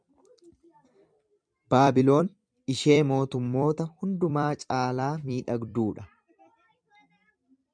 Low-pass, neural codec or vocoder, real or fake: 9.9 kHz; vocoder, 44.1 kHz, 128 mel bands every 256 samples, BigVGAN v2; fake